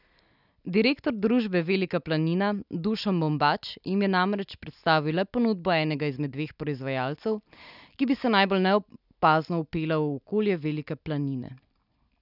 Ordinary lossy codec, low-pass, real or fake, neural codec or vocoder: none; 5.4 kHz; real; none